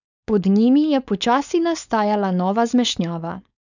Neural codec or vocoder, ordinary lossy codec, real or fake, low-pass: codec, 16 kHz, 4.8 kbps, FACodec; none; fake; 7.2 kHz